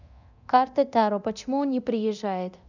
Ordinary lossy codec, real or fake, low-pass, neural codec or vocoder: none; fake; 7.2 kHz; codec, 24 kHz, 0.9 kbps, DualCodec